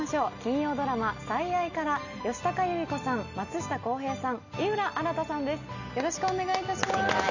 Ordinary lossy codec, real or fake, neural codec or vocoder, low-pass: none; real; none; 7.2 kHz